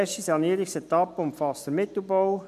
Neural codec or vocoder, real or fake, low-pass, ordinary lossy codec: none; real; 14.4 kHz; none